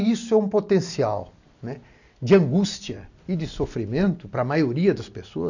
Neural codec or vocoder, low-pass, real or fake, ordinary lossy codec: none; 7.2 kHz; real; none